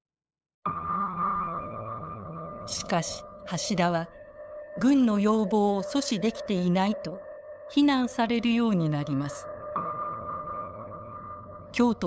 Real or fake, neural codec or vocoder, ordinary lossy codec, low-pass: fake; codec, 16 kHz, 8 kbps, FunCodec, trained on LibriTTS, 25 frames a second; none; none